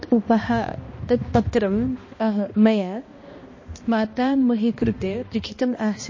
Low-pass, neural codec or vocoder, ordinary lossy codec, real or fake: 7.2 kHz; codec, 16 kHz, 1 kbps, X-Codec, HuBERT features, trained on balanced general audio; MP3, 32 kbps; fake